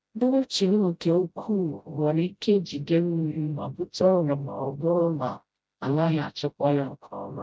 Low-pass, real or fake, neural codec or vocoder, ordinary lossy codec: none; fake; codec, 16 kHz, 0.5 kbps, FreqCodec, smaller model; none